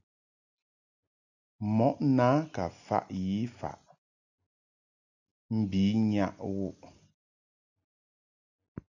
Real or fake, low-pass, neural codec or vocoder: real; 7.2 kHz; none